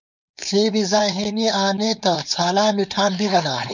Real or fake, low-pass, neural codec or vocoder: fake; 7.2 kHz; codec, 16 kHz, 4.8 kbps, FACodec